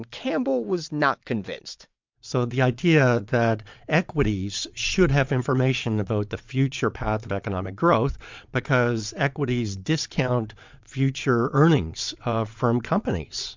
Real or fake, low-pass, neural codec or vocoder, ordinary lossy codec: fake; 7.2 kHz; vocoder, 22.05 kHz, 80 mel bands, WaveNeXt; MP3, 64 kbps